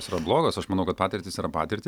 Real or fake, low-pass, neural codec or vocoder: real; 19.8 kHz; none